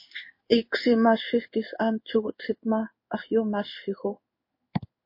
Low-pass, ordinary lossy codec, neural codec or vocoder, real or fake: 5.4 kHz; MP3, 32 kbps; codec, 16 kHz in and 24 kHz out, 1 kbps, XY-Tokenizer; fake